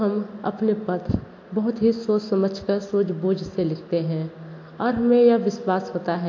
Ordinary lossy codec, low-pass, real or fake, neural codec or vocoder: AAC, 48 kbps; 7.2 kHz; real; none